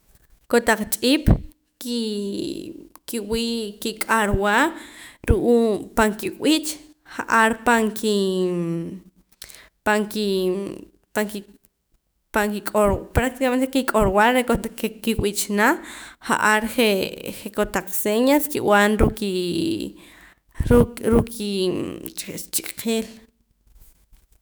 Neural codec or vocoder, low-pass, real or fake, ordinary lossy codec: autoencoder, 48 kHz, 128 numbers a frame, DAC-VAE, trained on Japanese speech; none; fake; none